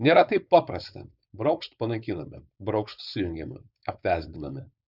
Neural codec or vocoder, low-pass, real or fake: codec, 16 kHz, 4.8 kbps, FACodec; 5.4 kHz; fake